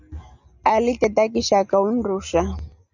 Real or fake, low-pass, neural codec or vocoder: real; 7.2 kHz; none